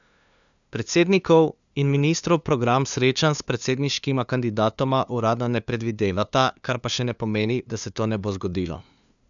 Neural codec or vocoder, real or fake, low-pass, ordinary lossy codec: codec, 16 kHz, 2 kbps, FunCodec, trained on LibriTTS, 25 frames a second; fake; 7.2 kHz; none